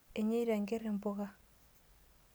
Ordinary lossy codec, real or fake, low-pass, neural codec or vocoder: none; real; none; none